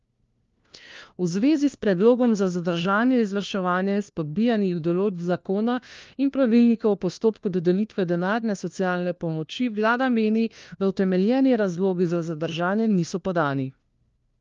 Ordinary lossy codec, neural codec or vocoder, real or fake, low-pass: Opus, 32 kbps; codec, 16 kHz, 1 kbps, FunCodec, trained on LibriTTS, 50 frames a second; fake; 7.2 kHz